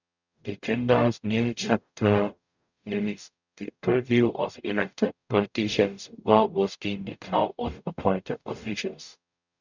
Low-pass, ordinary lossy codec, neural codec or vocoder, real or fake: 7.2 kHz; none; codec, 44.1 kHz, 0.9 kbps, DAC; fake